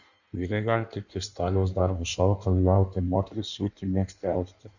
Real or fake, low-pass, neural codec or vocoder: fake; 7.2 kHz; codec, 16 kHz in and 24 kHz out, 1.1 kbps, FireRedTTS-2 codec